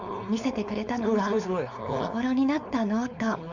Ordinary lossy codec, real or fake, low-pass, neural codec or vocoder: none; fake; 7.2 kHz; codec, 16 kHz, 4.8 kbps, FACodec